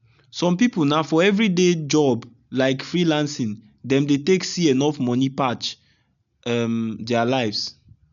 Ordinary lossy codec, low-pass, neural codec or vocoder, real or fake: none; 7.2 kHz; none; real